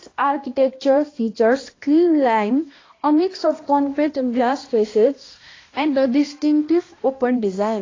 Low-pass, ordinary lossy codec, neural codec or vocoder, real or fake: 7.2 kHz; AAC, 32 kbps; codec, 16 kHz, 1 kbps, X-Codec, HuBERT features, trained on balanced general audio; fake